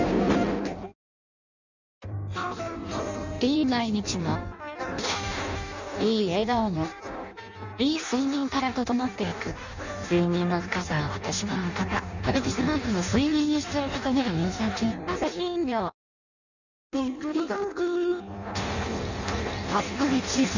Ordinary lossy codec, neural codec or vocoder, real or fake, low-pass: none; codec, 16 kHz in and 24 kHz out, 0.6 kbps, FireRedTTS-2 codec; fake; 7.2 kHz